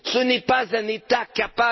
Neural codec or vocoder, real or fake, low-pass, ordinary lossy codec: none; real; 7.2 kHz; MP3, 24 kbps